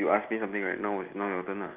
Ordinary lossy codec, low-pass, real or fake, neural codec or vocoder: Opus, 32 kbps; 3.6 kHz; real; none